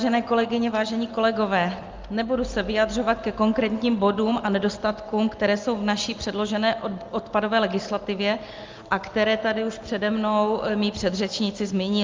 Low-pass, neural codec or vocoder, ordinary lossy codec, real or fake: 7.2 kHz; none; Opus, 32 kbps; real